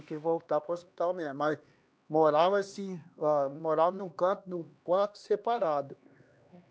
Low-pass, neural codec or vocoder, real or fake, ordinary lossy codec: none; codec, 16 kHz, 2 kbps, X-Codec, HuBERT features, trained on LibriSpeech; fake; none